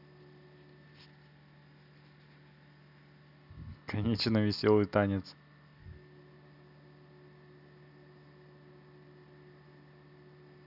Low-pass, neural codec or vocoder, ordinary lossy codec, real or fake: 5.4 kHz; none; none; real